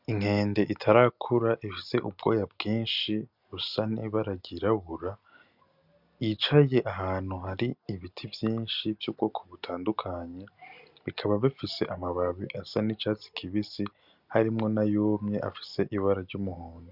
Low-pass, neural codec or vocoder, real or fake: 5.4 kHz; none; real